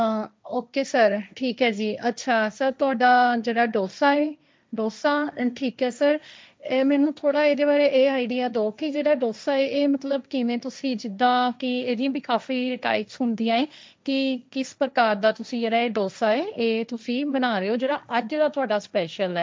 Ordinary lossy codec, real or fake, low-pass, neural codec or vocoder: none; fake; none; codec, 16 kHz, 1.1 kbps, Voila-Tokenizer